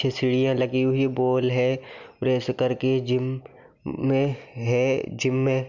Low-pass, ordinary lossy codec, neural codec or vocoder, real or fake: 7.2 kHz; none; none; real